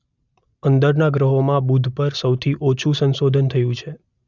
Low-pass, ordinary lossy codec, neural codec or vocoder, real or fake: 7.2 kHz; none; none; real